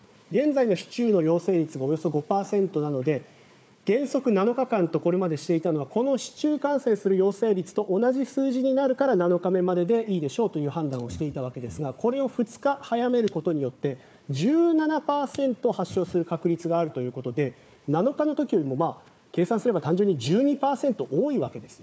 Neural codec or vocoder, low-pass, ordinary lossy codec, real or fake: codec, 16 kHz, 4 kbps, FunCodec, trained on Chinese and English, 50 frames a second; none; none; fake